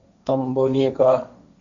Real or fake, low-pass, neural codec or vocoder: fake; 7.2 kHz; codec, 16 kHz, 1.1 kbps, Voila-Tokenizer